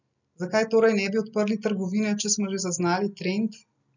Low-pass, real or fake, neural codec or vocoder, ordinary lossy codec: 7.2 kHz; real; none; none